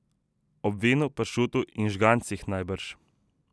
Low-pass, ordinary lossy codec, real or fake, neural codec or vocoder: none; none; real; none